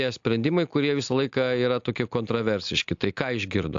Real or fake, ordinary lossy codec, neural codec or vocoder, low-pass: real; AAC, 64 kbps; none; 7.2 kHz